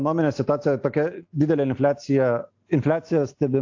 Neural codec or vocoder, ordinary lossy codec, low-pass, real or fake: none; AAC, 48 kbps; 7.2 kHz; real